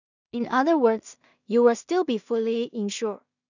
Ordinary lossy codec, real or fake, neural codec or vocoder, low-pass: none; fake; codec, 16 kHz in and 24 kHz out, 0.4 kbps, LongCat-Audio-Codec, two codebook decoder; 7.2 kHz